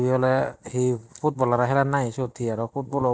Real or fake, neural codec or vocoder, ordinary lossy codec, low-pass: real; none; none; none